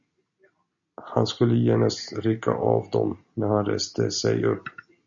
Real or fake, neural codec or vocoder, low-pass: real; none; 7.2 kHz